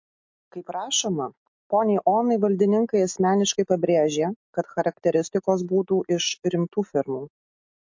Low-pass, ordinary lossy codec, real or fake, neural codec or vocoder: 7.2 kHz; MP3, 48 kbps; real; none